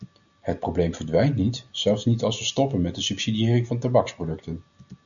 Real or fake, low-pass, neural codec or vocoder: real; 7.2 kHz; none